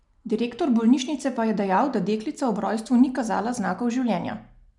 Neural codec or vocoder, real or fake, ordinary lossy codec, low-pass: none; real; none; 10.8 kHz